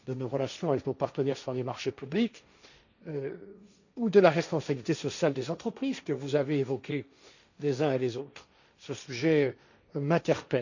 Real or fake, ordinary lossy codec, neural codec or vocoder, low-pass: fake; none; codec, 16 kHz, 1.1 kbps, Voila-Tokenizer; 7.2 kHz